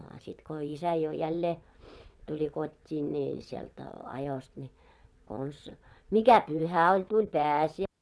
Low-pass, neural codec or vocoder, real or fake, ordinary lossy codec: none; vocoder, 22.05 kHz, 80 mel bands, WaveNeXt; fake; none